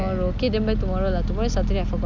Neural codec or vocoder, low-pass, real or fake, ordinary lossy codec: none; 7.2 kHz; real; none